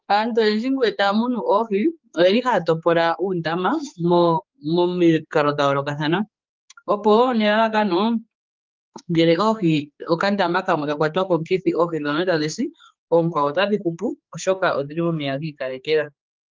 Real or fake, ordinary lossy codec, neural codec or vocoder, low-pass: fake; Opus, 24 kbps; codec, 16 kHz, 4 kbps, X-Codec, HuBERT features, trained on general audio; 7.2 kHz